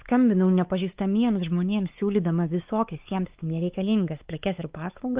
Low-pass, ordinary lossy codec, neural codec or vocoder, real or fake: 3.6 kHz; Opus, 32 kbps; codec, 16 kHz, 4 kbps, X-Codec, WavLM features, trained on Multilingual LibriSpeech; fake